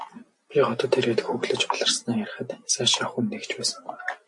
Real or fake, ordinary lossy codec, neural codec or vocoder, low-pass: real; MP3, 48 kbps; none; 10.8 kHz